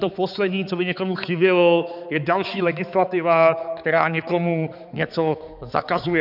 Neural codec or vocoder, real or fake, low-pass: codec, 16 kHz, 4 kbps, X-Codec, HuBERT features, trained on balanced general audio; fake; 5.4 kHz